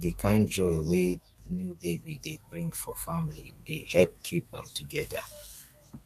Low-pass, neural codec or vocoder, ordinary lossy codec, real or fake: 14.4 kHz; codec, 32 kHz, 1.9 kbps, SNAC; none; fake